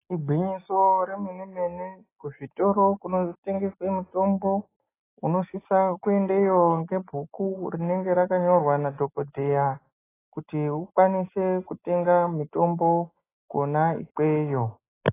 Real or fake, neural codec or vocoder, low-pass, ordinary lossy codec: real; none; 3.6 kHz; AAC, 16 kbps